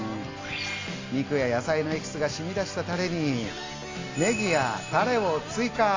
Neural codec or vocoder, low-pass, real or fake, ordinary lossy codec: none; 7.2 kHz; real; AAC, 32 kbps